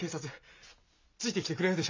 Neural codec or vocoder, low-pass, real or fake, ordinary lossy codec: none; 7.2 kHz; real; MP3, 64 kbps